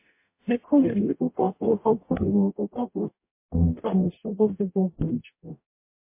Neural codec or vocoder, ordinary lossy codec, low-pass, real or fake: codec, 44.1 kHz, 0.9 kbps, DAC; MP3, 24 kbps; 3.6 kHz; fake